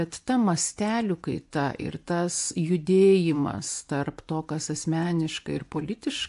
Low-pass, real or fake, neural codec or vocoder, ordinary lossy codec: 10.8 kHz; fake; vocoder, 24 kHz, 100 mel bands, Vocos; AAC, 64 kbps